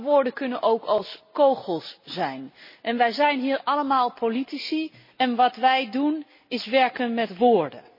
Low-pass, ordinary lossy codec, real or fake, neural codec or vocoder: 5.4 kHz; MP3, 24 kbps; real; none